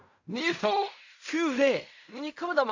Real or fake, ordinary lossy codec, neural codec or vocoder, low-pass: fake; AAC, 48 kbps; codec, 16 kHz in and 24 kHz out, 0.4 kbps, LongCat-Audio-Codec, fine tuned four codebook decoder; 7.2 kHz